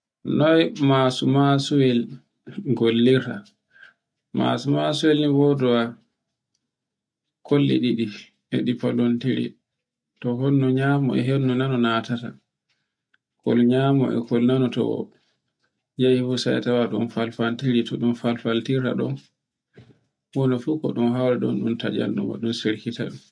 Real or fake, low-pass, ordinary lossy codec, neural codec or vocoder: real; 9.9 kHz; none; none